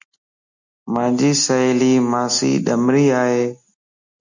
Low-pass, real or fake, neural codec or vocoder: 7.2 kHz; real; none